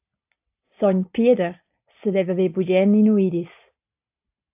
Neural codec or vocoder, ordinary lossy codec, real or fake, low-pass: none; AAC, 32 kbps; real; 3.6 kHz